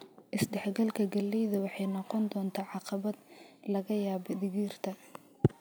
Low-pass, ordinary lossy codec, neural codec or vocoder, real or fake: none; none; none; real